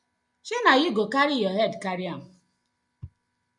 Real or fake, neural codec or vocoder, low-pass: real; none; 10.8 kHz